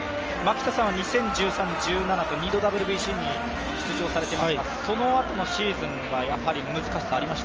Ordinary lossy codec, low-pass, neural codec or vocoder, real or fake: Opus, 24 kbps; 7.2 kHz; none; real